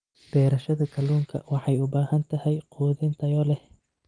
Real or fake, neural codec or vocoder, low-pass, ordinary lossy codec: real; none; 9.9 kHz; Opus, 32 kbps